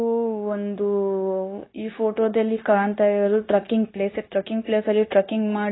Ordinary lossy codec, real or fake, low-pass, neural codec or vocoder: AAC, 16 kbps; fake; 7.2 kHz; codec, 16 kHz, 0.9 kbps, LongCat-Audio-Codec